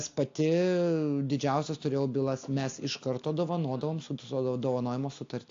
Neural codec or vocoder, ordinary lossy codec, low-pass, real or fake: none; AAC, 48 kbps; 7.2 kHz; real